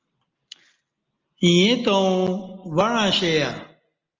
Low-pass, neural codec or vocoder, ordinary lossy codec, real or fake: 7.2 kHz; none; Opus, 24 kbps; real